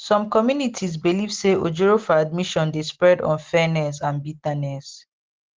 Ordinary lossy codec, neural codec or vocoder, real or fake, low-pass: Opus, 16 kbps; none; real; 7.2 kHz